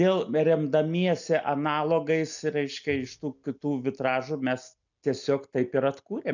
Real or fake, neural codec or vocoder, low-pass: real; none; 7.2 kHz